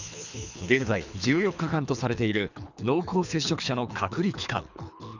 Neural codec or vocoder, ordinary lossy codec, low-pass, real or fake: codec, 24 kHz, 3 kbps, HILCodec; none; 7.2 kHz; fake